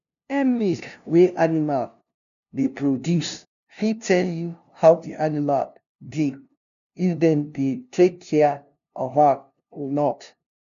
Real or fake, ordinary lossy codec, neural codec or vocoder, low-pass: fake; none; codec, 16 kHz, 0.5 kbps, FunCodec, trained on LibriTTS, 25 frames a second; 7.2 kHz